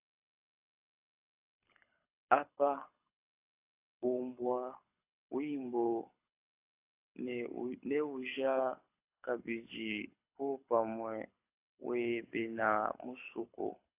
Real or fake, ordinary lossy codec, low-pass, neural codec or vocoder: fake; AAC, 32 kbps; 3.6 kHz; codec, 24 kHz, 6 kbps, HILCodec